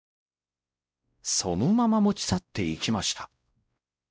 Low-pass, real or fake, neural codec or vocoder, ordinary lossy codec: none; fake; codec, 16 kHz, 0.5 kbps, X-Codec, WavLM features, trained on Multilingual LibriSpeech; none